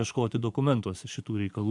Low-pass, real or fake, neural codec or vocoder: 10.8 kHz; fake; vocoder, 24 kHz, 100 mel bands, Vocos